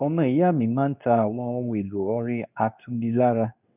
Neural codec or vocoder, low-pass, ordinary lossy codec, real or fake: codec, 24 kHz, 0.9 kbps, WavTokenizer, medium speech release version 2; 3.6 kHz; none; fake